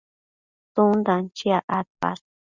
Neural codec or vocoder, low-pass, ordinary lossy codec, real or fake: none; 7.2 kHz; Opus, 64 kbps; real